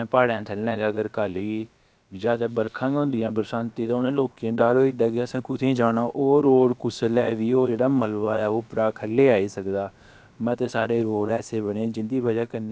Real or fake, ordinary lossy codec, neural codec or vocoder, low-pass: fake; none; codec, 16 kHz, about 1 kbps, DyCAST, with the encoder's durations; none